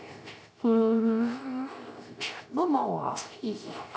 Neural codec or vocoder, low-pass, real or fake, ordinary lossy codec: codec, 16 kHz, 0.3 kbps, FocalCodec; none; fake; none